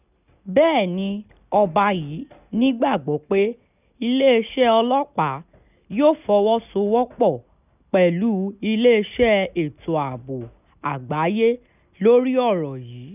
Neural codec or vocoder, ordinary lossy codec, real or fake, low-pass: none; none; real; 3.6 kHz